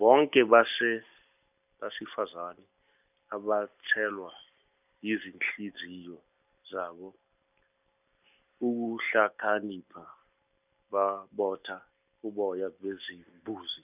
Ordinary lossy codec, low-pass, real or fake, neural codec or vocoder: none; 3.6 kHz; real; none